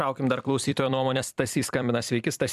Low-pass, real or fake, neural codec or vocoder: 14.4 kHz; fake; vocoder, 44.1 kHz, 128 mel bands every 256 samples, BigVGAN v2